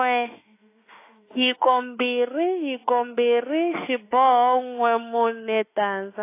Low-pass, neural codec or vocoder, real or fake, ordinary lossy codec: 3.6 kHz; autoencoder, 48 kHz, 32 numbers a frame, DAC-VAE, trained on Japanese speech; fake; AAC, 24 kbps